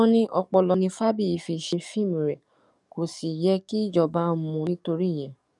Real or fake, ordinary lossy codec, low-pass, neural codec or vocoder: fake; MP3, 96 kbps; 10.8 kHz; codec, 44.1 kHz, 7.8 kbps, DAC